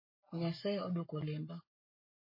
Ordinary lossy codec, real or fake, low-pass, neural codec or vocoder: MP3, 24 kbps; fake; 5.4 kHz; codec, 44.1 kHz, 7.8 kbps, Pupu-Codec